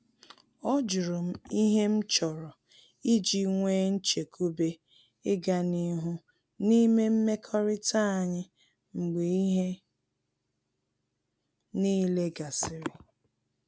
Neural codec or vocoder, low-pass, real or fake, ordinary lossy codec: none; none; real; none